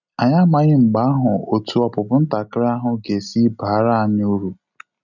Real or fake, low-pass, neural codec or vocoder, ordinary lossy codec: real; 7.2 kHz; none; none